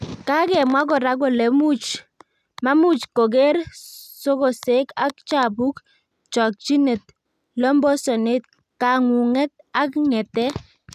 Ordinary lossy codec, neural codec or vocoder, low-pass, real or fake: none; none; 14.4 kHz; real